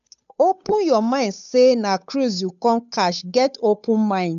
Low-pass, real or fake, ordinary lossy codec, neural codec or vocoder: 7.2 kHz; fake; none; codec, 16 kHz, 8 kbps, FunCodec, trained on Chinese and English, 25 frames a second